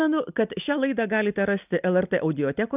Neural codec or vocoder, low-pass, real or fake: none; 3.6 kHz; real